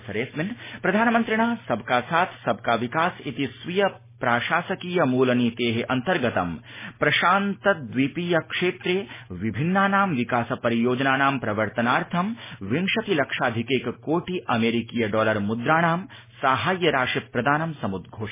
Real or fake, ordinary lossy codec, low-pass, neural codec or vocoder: real; MP3, 16 kbps; 3.6 kHz; none